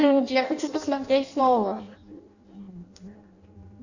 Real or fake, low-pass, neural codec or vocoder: fake; 7.2 kHz; codec, 16 kHz in and 24 kHz out, 0.6 kbps, FireRedTTS-2 codec